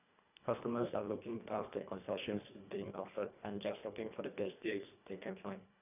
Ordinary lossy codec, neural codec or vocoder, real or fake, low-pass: none; codec, 24 kHz, 1.5 kbps, HILCodec; fake; 3.6 kHz